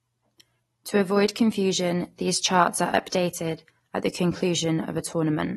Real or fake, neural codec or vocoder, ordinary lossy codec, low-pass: real; none; AAC, 32 kbps; 19.8 kHz